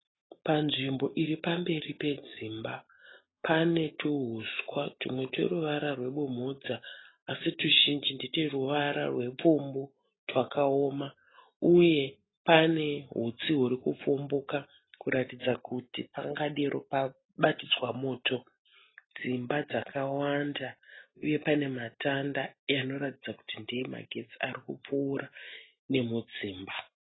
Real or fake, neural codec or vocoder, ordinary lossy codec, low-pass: real; none; AAC, 16 kbps; 7.2 kHz